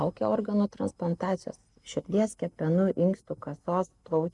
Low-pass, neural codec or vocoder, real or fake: 10.8 kHz; none; real